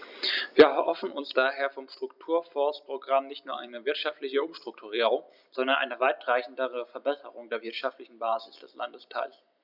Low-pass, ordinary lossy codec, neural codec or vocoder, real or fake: 5.4 kHz; none; none; real